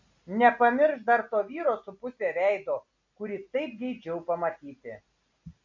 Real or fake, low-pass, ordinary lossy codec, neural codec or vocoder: real; 7.2 kHz; MP3, 48 kbps; none